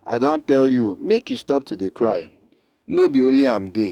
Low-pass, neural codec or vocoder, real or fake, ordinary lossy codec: 19.8 kHz; codec, 44.1 kHz, 2.6 kbps, DAC; fake; none